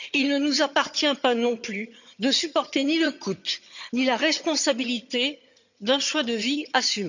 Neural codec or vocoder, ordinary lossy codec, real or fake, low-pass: vocoder, 22.05 kHz, 80 mel bands, HiFi-GAN; none; fake; 7.2 kHz